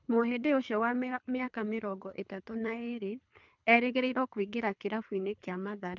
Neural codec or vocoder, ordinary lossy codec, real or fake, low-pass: codec, 24 kHz, 3 kbps, HILCodec; none; fake; 7.2 kHz